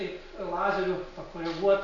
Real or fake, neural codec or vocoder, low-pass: real; none; 7.2 kHz